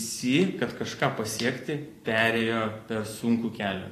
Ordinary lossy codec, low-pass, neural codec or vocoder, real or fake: AAC, 48 kbps; 14.4 kHz; vocoder, 44.1 kHz, 128 mel bands every 512 samples, BigVGAN v2; fake